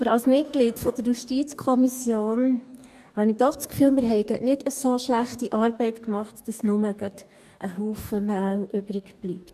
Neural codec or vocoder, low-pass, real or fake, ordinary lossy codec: codec, 44.1 kHz, 2.6 kbps, DAC; 14.4 kHz; fake; none